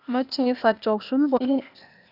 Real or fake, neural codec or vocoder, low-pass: fake; codec, 16 kHz, 0.8 kbps, ZipCodec; 5.4 kHz